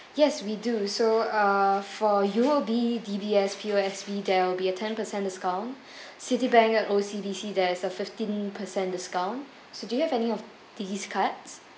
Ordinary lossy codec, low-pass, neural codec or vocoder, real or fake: none; none; none; real